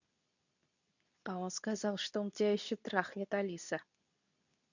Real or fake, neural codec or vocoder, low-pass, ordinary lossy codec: fake; codec, 24 kHz, 0.9 kbps, WavTokenizer, medium speech release version 2; 7.2 kHz; none